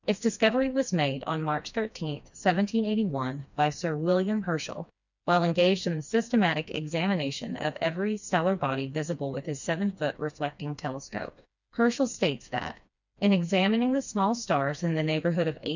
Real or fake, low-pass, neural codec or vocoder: fake; 7.2 kHz; codec, 16 kHz, 2 kbps, FreqCodec, smaller model